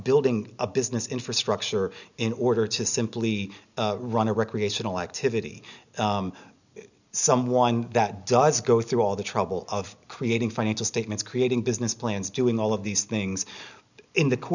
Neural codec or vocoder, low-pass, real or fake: none; 7.2 kHz; real